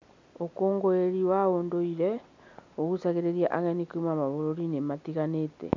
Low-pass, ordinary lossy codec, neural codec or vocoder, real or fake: 7.2 kHz; MP3, 64 kbps; none; real